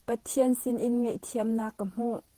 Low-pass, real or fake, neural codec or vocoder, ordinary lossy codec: 14.4 kHz; fake; vocoder, 48 kHz, 128 mel bands, Vocos; Opus, 16 kbps